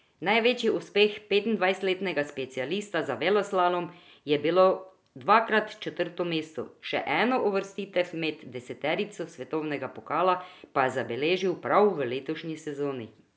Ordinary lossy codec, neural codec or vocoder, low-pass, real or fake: none; none; none; real